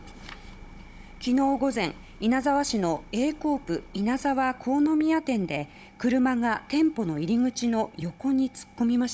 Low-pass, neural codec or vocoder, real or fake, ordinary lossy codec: none; codec, 16 kHz, 16 kbps, FunCodec, trained on Chinese and English, 50 frames a second; fake; none